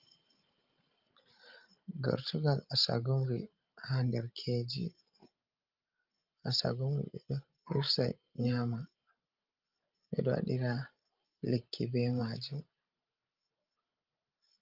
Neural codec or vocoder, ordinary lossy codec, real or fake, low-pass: codec, 16 kHz, 16 kbps, FreqCodec, larger model; Opus, 24 kbps; fake; 5.4 kHz